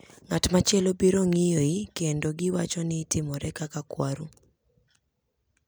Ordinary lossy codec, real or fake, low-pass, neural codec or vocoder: none; real; none; none